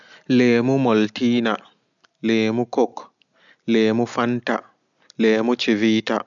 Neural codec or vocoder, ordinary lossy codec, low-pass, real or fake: none; none; 7.2 kHz; real